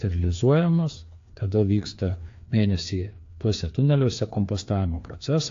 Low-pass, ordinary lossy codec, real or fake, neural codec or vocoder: 7.2 kHz; AAC, 48 kbps; fake; codec, 16 kHz, 2 kbps, FreqCodec, larger model